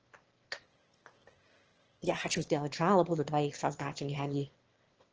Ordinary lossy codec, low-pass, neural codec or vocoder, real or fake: Opus, 16 kbps; 7.2 kHz; autoencoder, 22.05 kHz, a latent of 192 numbers a frame, VITS, trained on one speaker; fake